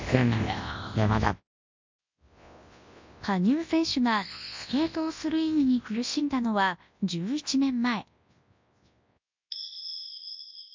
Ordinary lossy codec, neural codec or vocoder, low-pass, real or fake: MP3, 64 kbps; codec, 24 kHz, 0.9 kbps, WavTokenizer, large speech release; 7.2 kHz; fake